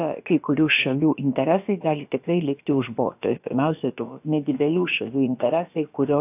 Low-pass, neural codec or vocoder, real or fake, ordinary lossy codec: 3.6 kHz; codec, 16 kHz, about 1 kbps, DyCAST, with the encoder's durations; fake; AAC, 32 kbps